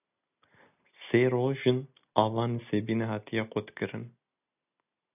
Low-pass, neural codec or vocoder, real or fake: 3.6 kHz; none; real